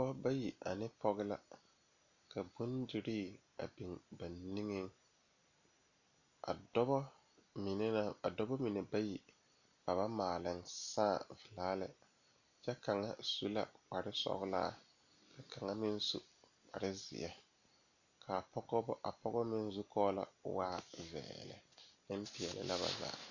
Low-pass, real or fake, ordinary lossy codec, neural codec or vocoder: 7.2 kHz; real; Opus, 64 kbps; none